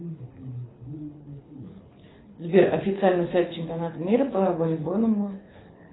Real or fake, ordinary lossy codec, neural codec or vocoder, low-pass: fake; AAC, 16 kbps; codec, 24 kHz, 6 kbps, HILCodec; 7.2 kHz